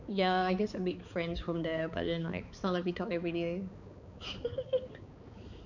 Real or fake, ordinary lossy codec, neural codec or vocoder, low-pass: fake; none; codec, 16 kHz, 4 kbps, X-Codec, HuBERT features, trained on balanced general audio; 7.2 kHz